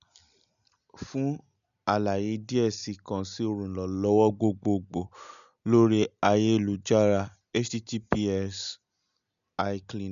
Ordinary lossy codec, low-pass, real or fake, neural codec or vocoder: none; 7.2 kHz; real; none